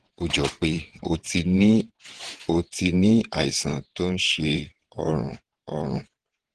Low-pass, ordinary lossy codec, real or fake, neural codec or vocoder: 9.9 kHz; Opus, 16 kbps; fake; vocoder, 22.05 kHz, 80 mel bands, WaveNeXt